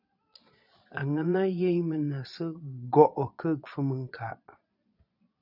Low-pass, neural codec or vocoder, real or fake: 5.4 kHz; vocoder, 22.05 kHz, 80 mel bands, Vocos; fake